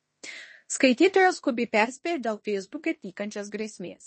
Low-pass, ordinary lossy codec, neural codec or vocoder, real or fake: 10.8 kHz; MP3, 32 kbps; codec, 16 kHz in and 24 kHz out, 0.9 kbps, LongCat-Audio-Codec, fine tuned four codebook decoder; fake